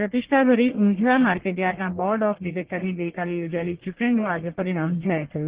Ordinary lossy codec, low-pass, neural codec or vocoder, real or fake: Opus, 16 kbps; 3.6 kHz; codec, 44.1 kHz, 1.7 kbps, Pupu-Codec; fake